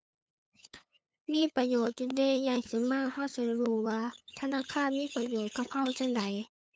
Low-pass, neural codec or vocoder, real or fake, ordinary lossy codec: none; codec, 16 kHz, 8 kbps, FunCodec, trained on LibriTTS, 25 frames a second; fake; none